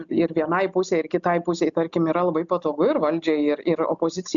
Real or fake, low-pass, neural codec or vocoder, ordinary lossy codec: real; 7.2 kHz; none; MP3, 96 kbps